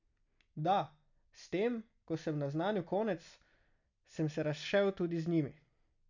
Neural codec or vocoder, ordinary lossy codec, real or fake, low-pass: none; none; real; 7.2 kHz